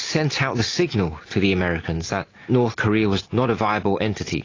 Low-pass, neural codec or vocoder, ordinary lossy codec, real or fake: 7.2 kHz; none; AAC, 32 kbps; real